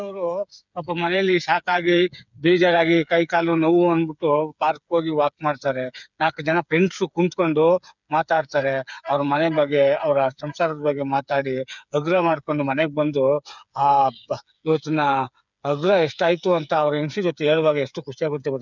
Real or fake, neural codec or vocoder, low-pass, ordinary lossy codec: fake; codec, 16 kHz, 8 kbps, FreqCodec, smaller model; 7.2 kHz; none